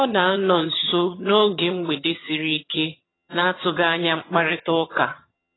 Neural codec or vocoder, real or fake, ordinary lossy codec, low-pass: vocoder, 22.05 kHz, 80 mel bands, HiFi-GAN; fake; AAC, 16 kbps; 7.2 kHz